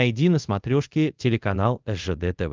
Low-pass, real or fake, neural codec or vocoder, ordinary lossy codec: 7.2 kHz; fake; codec, 24 kHz, 0.5 kbps, DualCodec; Opus, 24 kbps